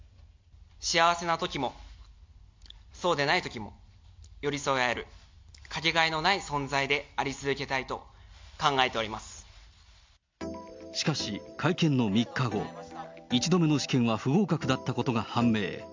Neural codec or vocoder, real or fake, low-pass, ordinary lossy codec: none; real; 7.2 kHz; MP3, 64 kbps